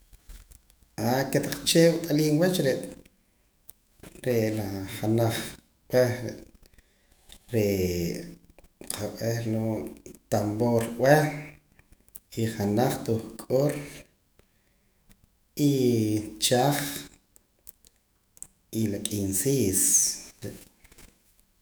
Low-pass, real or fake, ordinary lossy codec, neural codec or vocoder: none; fake; none; autoencoder, 48 kHz, 128 numbers a frame, DAC-VAE, trained on Japanese speech